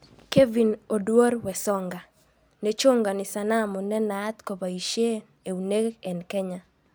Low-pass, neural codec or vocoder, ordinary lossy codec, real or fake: none; none; none; real